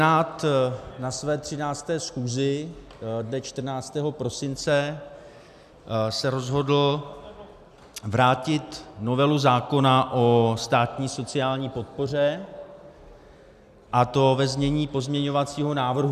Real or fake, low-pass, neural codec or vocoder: real; 14.4 kHz; none